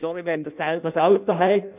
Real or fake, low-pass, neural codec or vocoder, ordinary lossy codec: fake; 3.6 kHz; codec, 16 kHz in and 24 kHz out, 0.6 kbps, FireRedTTS-2 codec; none